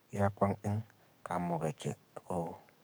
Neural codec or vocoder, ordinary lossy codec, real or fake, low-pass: codec, 44.1 kHz, 7.8 kbps, DAC; none; fake; none